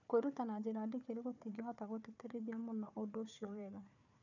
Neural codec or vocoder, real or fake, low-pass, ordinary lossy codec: codec, 16 kHz, 4 kbps, FunCodec, trained on Chinese and English, 50 frames a second; fake; 7.2 kHz; none